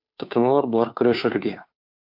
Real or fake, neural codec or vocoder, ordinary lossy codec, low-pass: fake; codec, 16 kHz, 2 kbps, FunCodec, trained on Chinese and English, 25 frames a second; MP3, 48 kbps; 5.4 kHz